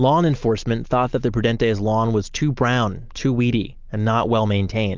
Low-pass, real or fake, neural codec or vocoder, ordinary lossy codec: 7.2 kHz; real; none; Opus, 24 kbps